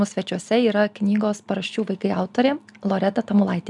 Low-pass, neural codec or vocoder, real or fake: 10.8 kHz; none; real